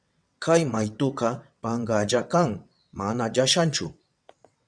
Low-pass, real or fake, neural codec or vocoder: 9.9 kHz; fake; vocoder, 22.05 kHz, 80 mel bands, WaveNeXt